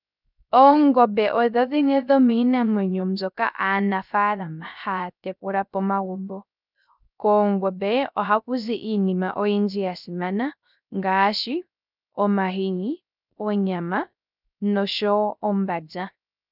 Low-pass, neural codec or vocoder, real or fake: 5.4 kHz; codec, 16 kHz, 0.3 kbps, FocalCodec; fake